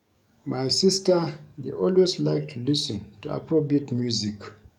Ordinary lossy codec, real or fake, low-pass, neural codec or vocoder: none; fake; 19.8 kHz; codec, 44.1 kHz, 7.8 kbps, DAC